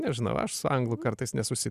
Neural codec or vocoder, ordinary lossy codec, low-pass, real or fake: none; Opus, 64 kbps; 14.4 kHz; real